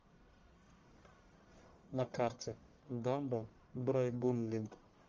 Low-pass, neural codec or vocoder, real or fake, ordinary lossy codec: 7.2 kHz; codec, 44.1 kHz, 1.7 kbps, Pupu-Codec; fake; Opus, 32 kbps